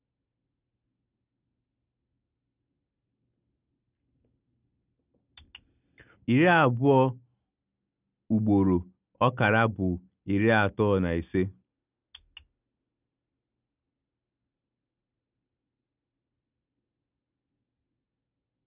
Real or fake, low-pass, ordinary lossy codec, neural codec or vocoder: real; 3.6 kHz; none; none